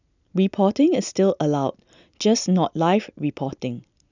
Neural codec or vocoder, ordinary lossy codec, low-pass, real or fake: none; none; 7.2 kHz; real